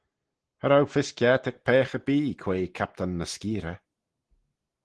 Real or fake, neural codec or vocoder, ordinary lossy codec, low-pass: real; none; Opus, 16 kbps; 10.8 kHz